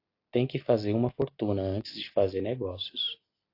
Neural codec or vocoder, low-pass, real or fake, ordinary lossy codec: none; 5.4 kHz; real; AAC, 32 kbps